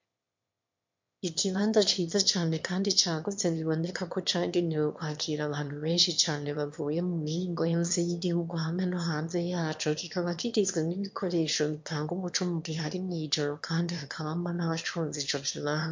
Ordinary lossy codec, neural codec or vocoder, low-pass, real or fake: MP3, 48 kbps; autoencoder, 22.05 kHz, a latent of 192 numbers a frame, VITS, trained on one speaker; 7.2 kHz; fake